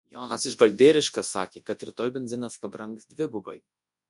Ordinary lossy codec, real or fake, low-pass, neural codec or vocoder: MP3, 64 kbps; fake; 10.8 kHz; codec, 24 kHz, 0.9 kbps, WavTokenizer, large speech release